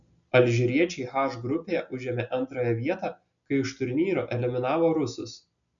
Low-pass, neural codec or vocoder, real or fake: 7.2 kHz; none; real